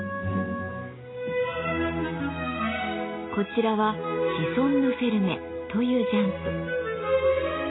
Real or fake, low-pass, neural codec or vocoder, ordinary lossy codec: real; 7.2 kHz; none; AAC, 16 kbps